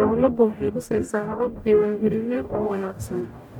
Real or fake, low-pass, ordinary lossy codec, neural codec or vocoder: fake; 19.8 kHz; none; codec, 44.1 kHz, 0.9 kbps, DAC